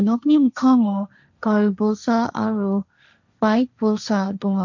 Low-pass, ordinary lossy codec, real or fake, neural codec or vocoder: 7.2 kHz; none; fake; codec, 16 kHz, 1.1 kbps, Voila-Tokenizer